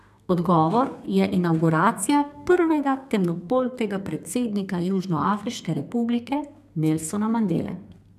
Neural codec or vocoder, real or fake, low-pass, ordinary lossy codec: codec, 32 kHz, 1.9 kbps, SNAC; fake; 14.4 kHz; none